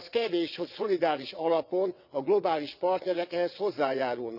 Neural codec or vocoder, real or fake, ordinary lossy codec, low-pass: vocoder, 22.05 kHz, 80 mel bands, WaveNeXt; fake; none; 5.4 kHz